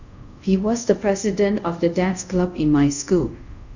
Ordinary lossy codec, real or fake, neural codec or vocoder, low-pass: none; fake; codec, 24 kHz, 0.5 kbps, DualCodec; 7.2 kHz